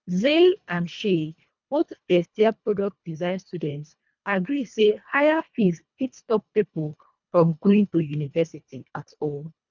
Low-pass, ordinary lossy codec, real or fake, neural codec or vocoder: 7.2 kHz; none; fake; codec, 24 kHz, 1.5 kbps, HILCodec